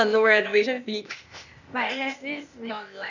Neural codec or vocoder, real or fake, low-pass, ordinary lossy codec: codec, 16 kHz, 0.8 kbps, ZipCodec; fake; 7.2 kHz; none